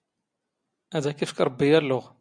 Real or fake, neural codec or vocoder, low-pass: real; none; 9.9 kHz